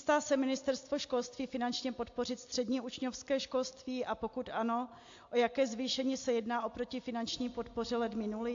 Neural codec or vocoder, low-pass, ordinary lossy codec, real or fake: none; 7.2 kHz; AAC, 48 kbps; real